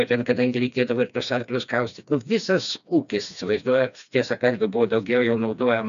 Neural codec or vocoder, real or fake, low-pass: codec, 16 kHz, 2 kbps, FreqCodec, smaller model; fake; 7.2 kHz